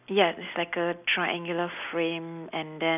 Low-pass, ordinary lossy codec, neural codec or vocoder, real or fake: 3.6 kHz; none; none; real